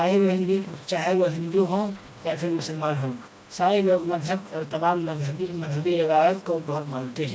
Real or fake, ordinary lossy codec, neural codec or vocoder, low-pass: fake; none; codec, 16 kHz, 1 kbps, FreqCodec, smaller model; none